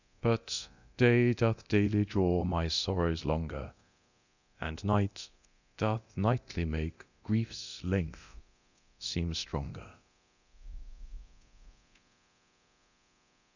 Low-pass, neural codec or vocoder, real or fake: 7.2 kHz; codec, 24 kHz, 0.9 kbps, DualCodec; fake